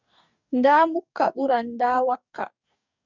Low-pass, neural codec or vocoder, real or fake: 7.2 kHz; codec, 44.1 kHz, 2.6 kbps, DAC; fake